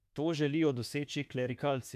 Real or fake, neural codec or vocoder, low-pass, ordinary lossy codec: fake; autoencoder, 48 kHz, 32 numbers a frame, DAC-VAE, trained on Japanese speech; 14.4 kHz; none